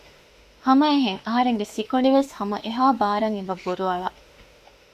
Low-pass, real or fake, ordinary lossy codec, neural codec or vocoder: 14.4 kHz; fake; Opus, 64 kbps; autoencoder, 48 kHz, 32 numbers a frame, DAC-VAE, trained on Japanese speech